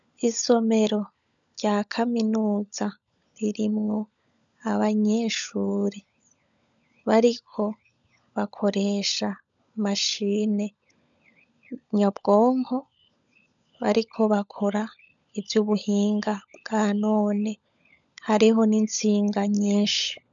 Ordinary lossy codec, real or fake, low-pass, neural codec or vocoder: MP3, 96 kbps; fake; 7.2 kHz; codec, 16 kHz, 16 kbps, FunCodec, trained on LibriTTS, 50 frames a second